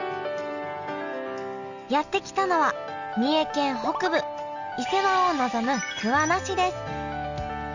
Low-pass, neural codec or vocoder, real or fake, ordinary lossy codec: 7.2 kHz; none; real; none